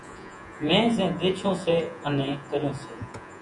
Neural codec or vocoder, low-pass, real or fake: vocoder, 48 kHz, 128 mel bands, Vocos; 10.8 kHz; fake